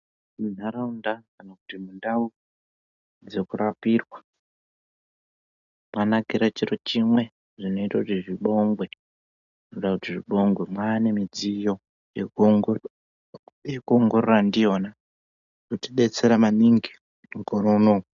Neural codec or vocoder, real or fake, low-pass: none; real; 7.2 kHz